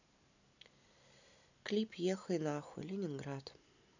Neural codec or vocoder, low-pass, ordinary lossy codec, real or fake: none; 7.2 kHz; none; real